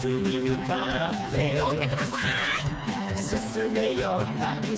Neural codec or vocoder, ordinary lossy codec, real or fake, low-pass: codec, 16 kHz, 2 kbps, FreqCodec, smaller model; none; fake; none